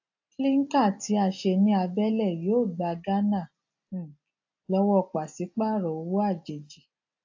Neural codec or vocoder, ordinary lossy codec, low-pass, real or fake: none; AAC, 48 kbps; 7.2 kHz; real